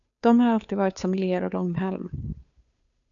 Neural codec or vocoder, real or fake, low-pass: codec, 16 kHz, 2 kbps, FunCodec, trained on Chinese and English, 25 frames a second; fake; 7.2 kHz